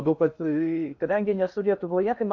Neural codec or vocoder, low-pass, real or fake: codec, 16 kHz in and 24 kHz out, 0.6 kbps, FocalCodec, streaming, 2048 codes; 7.2 kHz; fake